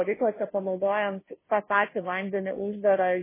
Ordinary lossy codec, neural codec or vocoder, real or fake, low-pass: MP3, 16 kbps; codec, 16 kHz, 0.5 kbps, FunCodec, trained on Chinese and English, 25 frames a second; fake; 3.6 kHz